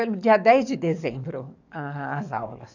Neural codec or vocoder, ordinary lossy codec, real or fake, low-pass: codec, 44.1 kHz, 7.8 kbps, DAC; none; fake; 7.2 kHz